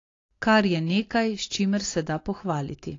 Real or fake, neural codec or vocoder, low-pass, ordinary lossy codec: real; none; 7.2 kHz; AAC, 32 kbps